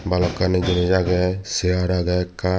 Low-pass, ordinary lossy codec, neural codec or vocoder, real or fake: none; none; none; real